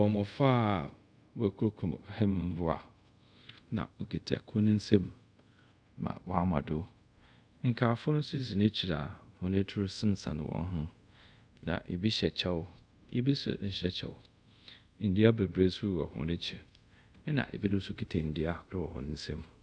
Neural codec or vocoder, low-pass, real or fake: codec, 24 kHz, 0.5 kbps, DualCodec; 9.9 kHz; fake